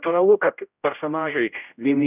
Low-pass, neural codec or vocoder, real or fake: 3.6 kHz; codec, 16 kHz, 0.5 kbps, X-Codec, HuBERT features, trained on general audio; fake